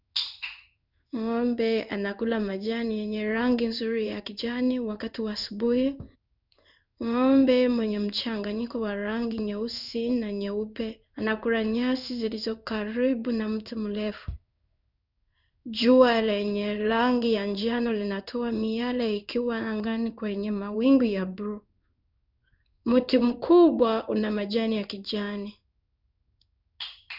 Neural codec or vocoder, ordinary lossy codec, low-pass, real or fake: codec, 16 kHz in and 24 kHz out, 1 kbps, XY-Tokenizer; none; 5.4 kHz; fake